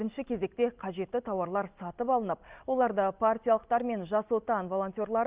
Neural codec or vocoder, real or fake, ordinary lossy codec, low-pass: none; real; Opus, 24 kbps; 3.6 kHz